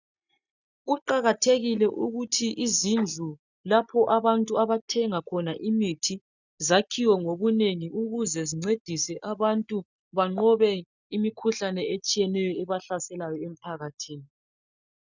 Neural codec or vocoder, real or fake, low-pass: none; real; 7.2 kHz